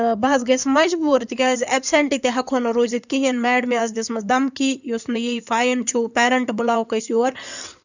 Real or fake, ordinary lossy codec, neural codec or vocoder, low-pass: fake; none; codec, 16 kHz in and 24 kHz out, 2.2 kbps, FireRedTTS-2 codec; 7.2 kHz